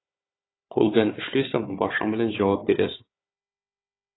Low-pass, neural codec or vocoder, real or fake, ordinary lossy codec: 7.2 kHz; codec, 16 kHz, 4 kbps, FunCodec, trained on Chinese and English, 50 frames a second; fake; AAC, 16 kbps